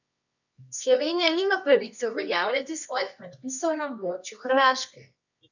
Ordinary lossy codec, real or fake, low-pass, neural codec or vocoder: none; fake; 7.2 kHz; codec, 24 kHz, 0.9 kbps, WavTokenizer, medium music audio release